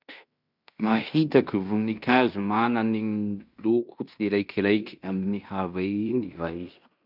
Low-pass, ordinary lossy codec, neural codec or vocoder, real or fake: 5.4 kHz; none; codec, 16 kHz in and 24 kHz out, 0.9 kbps, LongCat-Audio-Codec, fine tuned four codebook decoder; fake